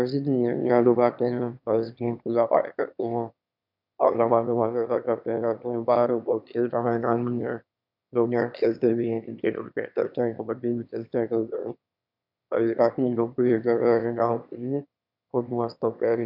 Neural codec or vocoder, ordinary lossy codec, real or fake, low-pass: autoencoder, 22.05 kHz, a latent of 192 numbers a frame, VITS, trained on one speaker; none; fake; 5.4 kHz